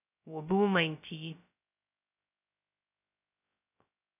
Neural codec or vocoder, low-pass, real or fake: codec, 16 kHz, 0.2 kbps, FocalCodec; 3.6 kHz; fake